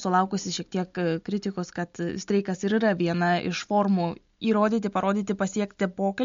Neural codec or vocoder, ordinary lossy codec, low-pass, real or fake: none; MP3, 48 kbps; 7.2 kHz; real